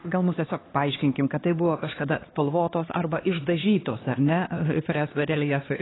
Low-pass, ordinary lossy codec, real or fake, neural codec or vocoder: 7.2 kHz; AAC, 16 kbps; fake; codec, 16 kHz, 2 kbps, X-Codec, HuBERT features, trained on LibriSpeech